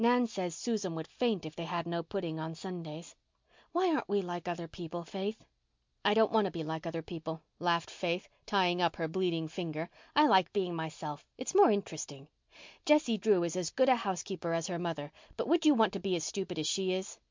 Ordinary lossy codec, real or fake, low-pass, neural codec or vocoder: MP3, 64 kbps; real; 7.2 kHz; none